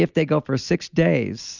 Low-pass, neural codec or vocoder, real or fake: 7.2 kHz; none; real